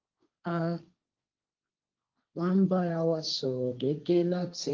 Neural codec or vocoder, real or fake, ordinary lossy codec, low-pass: codec, 16 kHz, 1.1 kbps, Voila-Tokenizer; fake; Opus, 24 kbps; 7.2 kHz